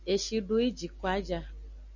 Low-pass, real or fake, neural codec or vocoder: 7.2 kHz; real; none